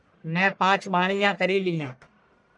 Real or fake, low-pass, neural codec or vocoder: fake; 10.8 kHz; codec, 44.1 kHz, 1.7 kbps, Pupu-Codec